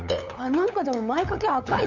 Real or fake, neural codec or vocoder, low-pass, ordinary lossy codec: fake; codec, 16 kHz, 8 kbps, FunCodec, trained on LibriTTS, 25 frames a second; 7.2 kHz; none